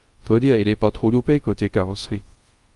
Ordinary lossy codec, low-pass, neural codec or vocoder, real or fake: Opus, 32 kbps; 10.8 kHz; codec, 24 kHz, 0.5 kbps, DualCodec; fake